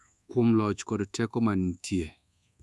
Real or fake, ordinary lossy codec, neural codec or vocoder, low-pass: fake; none; codec, 24 kHz, 1.2 kbps, DualCodec; none